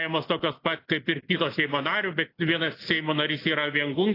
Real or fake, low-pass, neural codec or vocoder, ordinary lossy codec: real; 5.4 kHz; none; AAC, 32 kbps